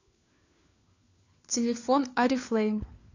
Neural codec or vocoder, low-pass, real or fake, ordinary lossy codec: codec, 16 kHz, 4 kbps, FunCodec, trained on LibriTTS, 50 frames a second; 7.2 kHz; fake; AAC, 48 kbps